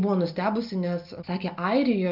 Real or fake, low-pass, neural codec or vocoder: real; 5.4 kHz; none